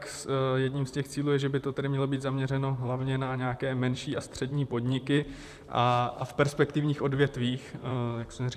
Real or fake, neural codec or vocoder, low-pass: fake; vocoder, 44.1 kHz, 128 mel bands, Pupu-Vocoder; 14.4 kHz